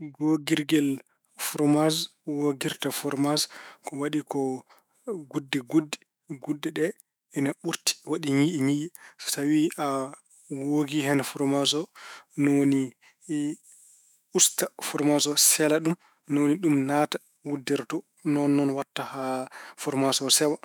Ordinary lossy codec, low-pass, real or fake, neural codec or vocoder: none; none; fake; autoencoder, 48 kHz, 128 numbers a frame, DAC-VAE, trained on Japanese speech